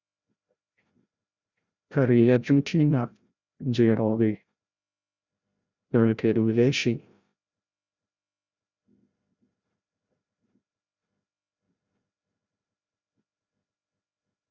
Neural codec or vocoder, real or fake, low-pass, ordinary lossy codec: codec, 16 kHz, 0.5 kbps, FreqCodec, larger model; fake; 7.2 kHz; Opus, 64 kbps